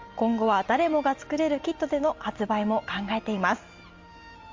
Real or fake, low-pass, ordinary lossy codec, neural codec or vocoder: real; 7.2 kHz; Opus, 32 kbps; none